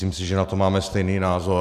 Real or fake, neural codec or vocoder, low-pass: real; none; 14.4 kHz